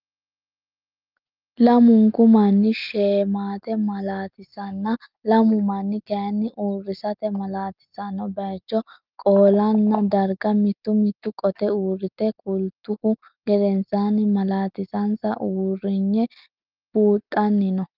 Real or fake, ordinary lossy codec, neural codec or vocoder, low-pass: real; Opus, 32 kbps; none; 5.4 kHz